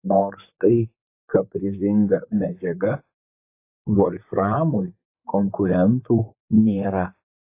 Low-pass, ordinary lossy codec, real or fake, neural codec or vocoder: 3.6 kHz; AAC, 24 kbps; fake; vocoder, 44.1 kHz, 128 mel bands, Pupu-Vocoder